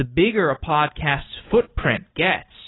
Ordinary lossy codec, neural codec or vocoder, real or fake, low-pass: AAC, 16 kbps; none; real; 7.2 kHz